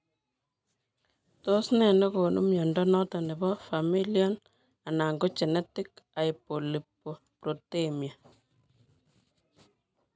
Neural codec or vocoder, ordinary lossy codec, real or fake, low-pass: none; none; real; none